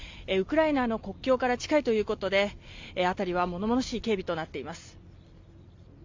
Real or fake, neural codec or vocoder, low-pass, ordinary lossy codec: real; none; 7.2 kHz; MP3, 64 kbps